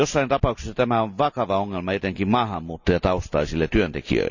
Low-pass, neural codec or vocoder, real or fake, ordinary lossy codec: 7.2 kHz; none; real; none